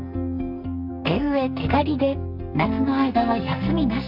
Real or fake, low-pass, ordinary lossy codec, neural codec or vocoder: fake; 5.4 kHz; none; codec, 44.1 kHz, 2.6 kbps, SNAC